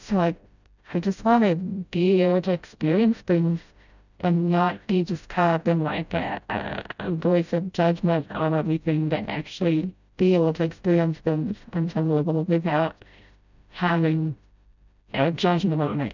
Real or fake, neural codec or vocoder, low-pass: fake; codec, 16 kHz, 0.5 kbps, FreqCodec, smaller model; 7.2 kHz